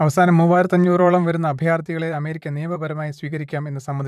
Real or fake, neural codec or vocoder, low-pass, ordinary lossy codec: fake; vocoder, 44.1 kHz, 128 mel bands, Pupu-Vocoder; 14.4 kHz; none